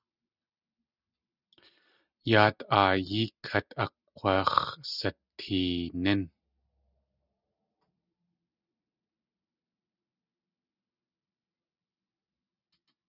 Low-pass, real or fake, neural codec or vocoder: 5.4 kHz; real; none